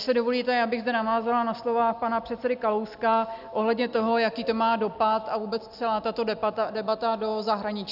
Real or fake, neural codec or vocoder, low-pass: real; none; 5.4 kHz